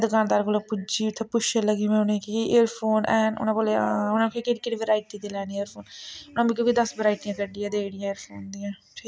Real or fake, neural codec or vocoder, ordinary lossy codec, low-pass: real; none; none; none